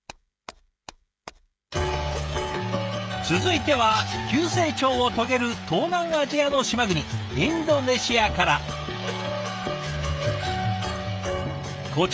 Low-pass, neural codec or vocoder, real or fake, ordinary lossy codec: none; codec, 16 kHz, 16 kbps, FreqCodec, smaller model; fake; none